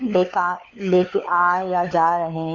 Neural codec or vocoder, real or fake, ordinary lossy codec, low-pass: codec, 16 kHz, 4 kbps, FunCodec, trained on LibriTTS, 50 frames a second; fake; none; 7.2 kHz